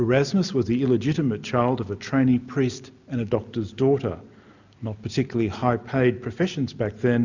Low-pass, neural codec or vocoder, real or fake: 7.2 kHz; none; real